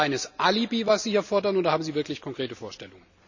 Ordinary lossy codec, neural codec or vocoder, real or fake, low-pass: none; none; real; 7.2 kHz